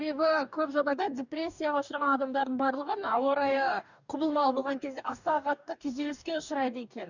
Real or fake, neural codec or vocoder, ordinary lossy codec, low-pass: fake; codec, 44.1 kHz, 2.6 kbps, DAC; none; 7.2 kHz